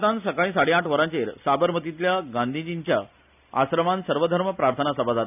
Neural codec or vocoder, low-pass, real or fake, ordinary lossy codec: none; 3.6 kHz; real; none